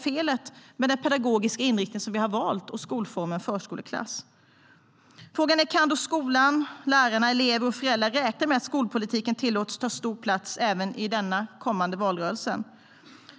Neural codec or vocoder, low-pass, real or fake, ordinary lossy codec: none; none; real; none